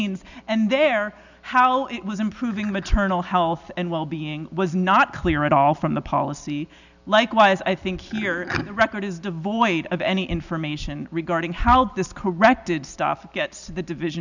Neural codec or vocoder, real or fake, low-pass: none; real; 7.2 kHz